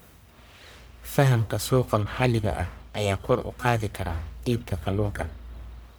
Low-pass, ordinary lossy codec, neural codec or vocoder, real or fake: none; none; codec, 44.1 kHz, 1.7 kbps, Pupu-Codec; fake